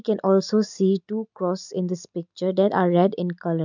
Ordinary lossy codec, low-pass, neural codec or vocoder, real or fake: none; 7.2 kHz; none; real